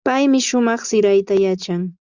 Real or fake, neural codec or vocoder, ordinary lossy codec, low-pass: real; none; Opus, 64 kbps; 7.2 kHz